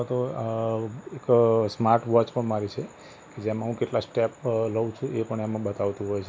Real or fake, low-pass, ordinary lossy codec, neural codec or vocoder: real; none; none; none